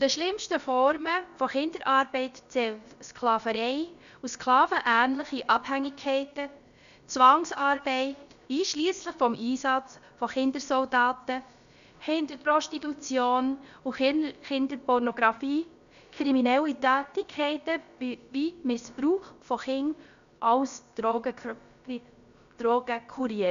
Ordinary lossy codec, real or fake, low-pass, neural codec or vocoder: none; fake; 7.2 kHz; codec, 16 kHz, about 1 kbps, DyCAST, with the encoder's durations